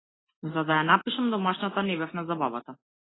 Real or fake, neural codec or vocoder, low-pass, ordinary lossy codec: real; none; 7.2 kHz; AAC, 16 kbps